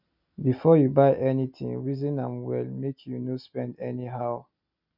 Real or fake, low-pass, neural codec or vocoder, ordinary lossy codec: real; 5.4 kHz; none; none